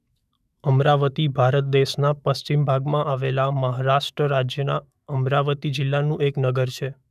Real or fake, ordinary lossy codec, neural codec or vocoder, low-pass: fake; none; autoencoder, 48 kHz, 128 numbers a frame, DAC-VAE, trained on Japanese speech; 14.4 kHz